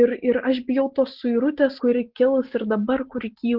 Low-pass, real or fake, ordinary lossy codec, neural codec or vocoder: 5.4 kHz; real; Opus, 16 kbps; none